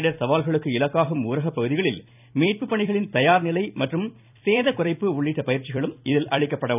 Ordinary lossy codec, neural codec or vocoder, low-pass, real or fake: none; vocoder, 44.1 kHz, 128 mel bands every 256 samples, BigVGAN v2; 3.6 kHz; fake